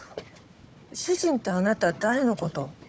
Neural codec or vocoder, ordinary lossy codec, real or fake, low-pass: codec, 16 kHz, 4 kbps, FunCodec, trained on Chinese and English, 50 frames a second; none; fake; none